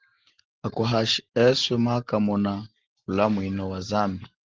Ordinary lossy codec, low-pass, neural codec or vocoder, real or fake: Opus, 16 kbps; 7.2 kHz; none; real